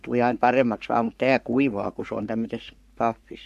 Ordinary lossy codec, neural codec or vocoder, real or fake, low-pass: MP3, 96 kbps; codec, 44.1 kHz, 3.4 kbps, Pupu-Codec; fake; 14.4 kHz